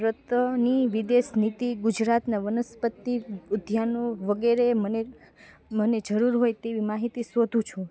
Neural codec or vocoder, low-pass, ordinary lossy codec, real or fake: none; none; none; real